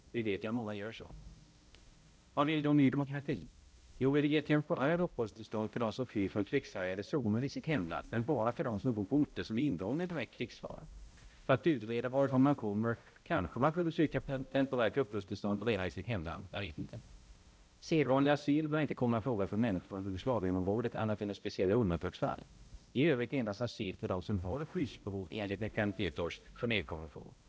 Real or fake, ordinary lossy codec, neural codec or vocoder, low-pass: fake; none; codec, 16 kHz, 0.5 kbps, X-Codec, HuBERT features, trained on balanced general audio; none